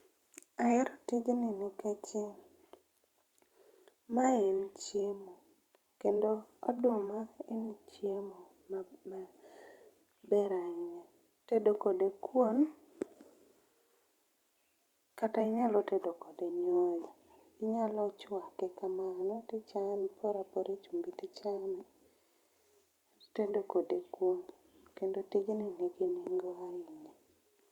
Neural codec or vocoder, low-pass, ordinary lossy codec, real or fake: vocoder, 44.1 kHz, 128 mel bands every 512 samples, BigVGAN v2; 19.8 kHz; Opus, 64 kbps; fake